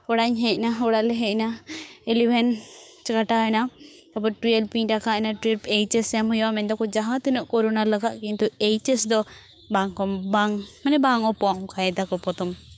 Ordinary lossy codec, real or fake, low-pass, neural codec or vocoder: none; fake; none; codec, 16 kHz, 6 kbps, DAC